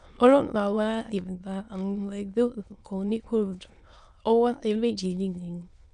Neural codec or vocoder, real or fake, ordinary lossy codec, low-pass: autoencoder, 22.05 kHz, a latent of 192 numbers a frame, VITS, trained on many speakers; fake; none; 9.9 kHz